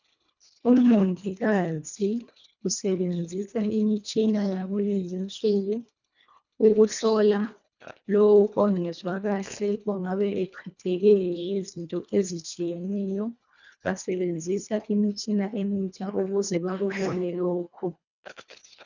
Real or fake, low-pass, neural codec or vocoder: fake; 7.2 kHz; codec, 24 kHz, 1.5 kbps, HILCodec